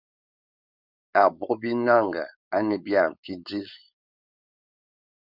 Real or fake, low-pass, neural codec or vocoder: fake; 5.4 kHz; codec, 16 kHz, 4.8 kbps, FACodec